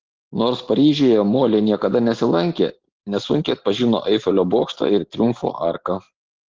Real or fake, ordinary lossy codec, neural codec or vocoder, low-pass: real; Opus, 16 kbps; none; 7.2 kHz